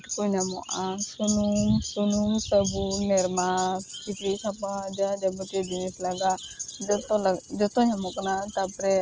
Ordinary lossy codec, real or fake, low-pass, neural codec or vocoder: Opus, 24 kbps; real; 7.2 kHz; none